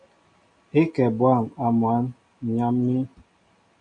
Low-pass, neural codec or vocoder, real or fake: 9.9 kHz; none; real